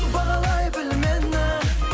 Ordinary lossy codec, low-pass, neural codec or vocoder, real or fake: none; none; none; real